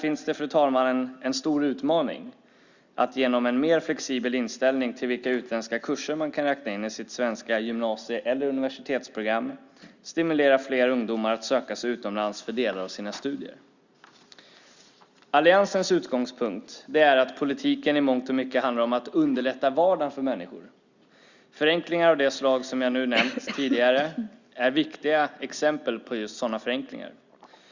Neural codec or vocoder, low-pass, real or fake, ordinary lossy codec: none; 7.2 kHz; real; Opus, 64 kbps